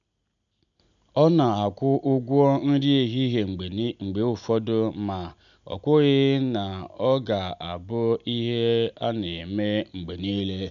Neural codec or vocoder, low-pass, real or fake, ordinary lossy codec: none; 7.2 kHz; real; none